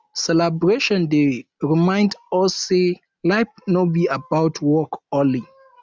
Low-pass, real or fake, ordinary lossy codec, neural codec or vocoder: none; real; none; none